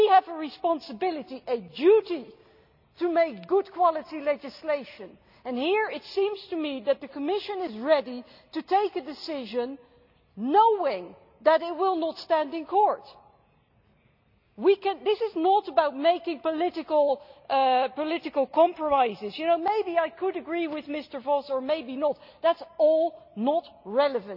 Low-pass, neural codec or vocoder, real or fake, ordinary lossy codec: 5.4 kHz; none; real; none